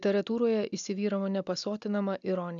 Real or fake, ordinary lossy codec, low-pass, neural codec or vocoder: real; MP3, 96 kbps; 7.2 kHz; none